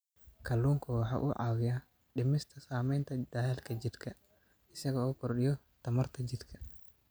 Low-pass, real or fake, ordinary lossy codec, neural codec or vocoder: none; real; none; none